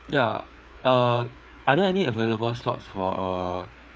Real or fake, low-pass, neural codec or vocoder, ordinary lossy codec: fake; none; codec, 16 kHz, 4 kbps, FreqCodec, larger model; none